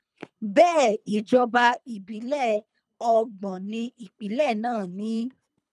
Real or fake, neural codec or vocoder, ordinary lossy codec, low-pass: fake; codec, 24 kHz, 3 kbps, HILCodec; none; none